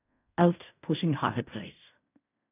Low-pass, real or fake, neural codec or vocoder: 3.6 kHz; fake; codec, 16 kHz in and 24 kHz out, 0.4 kbps, LongCat-Audio-Codec, fine tuned four codebook decoder